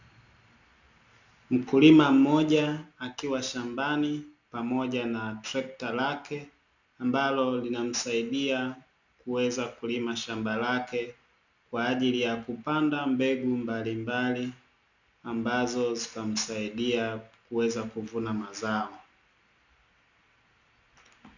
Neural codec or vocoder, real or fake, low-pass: none; real; 7.2 kHz